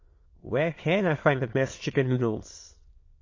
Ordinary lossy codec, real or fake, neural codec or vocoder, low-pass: MP3, 32 kbps; fake; autoencoder, 22.05 kHz, a latent of 192 numbers a frame, VITS, trained on many speakers; 7.2 kHz